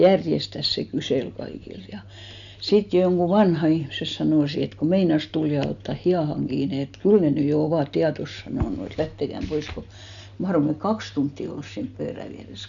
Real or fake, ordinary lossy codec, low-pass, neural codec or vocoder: real; none; 7.2 kHz; none